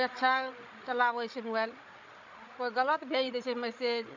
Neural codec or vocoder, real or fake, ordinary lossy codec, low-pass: codec, 16 kHz, 16 kbps, FreqCodec, larger model; fake; MP3, 64 kbps; 7.2 kHz